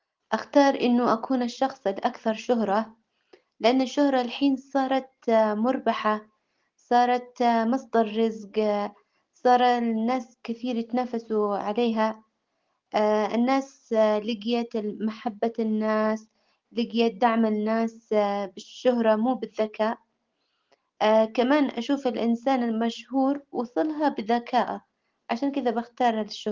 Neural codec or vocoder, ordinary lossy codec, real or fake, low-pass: none; Opus, 16 kbps; real; 7.2 kHz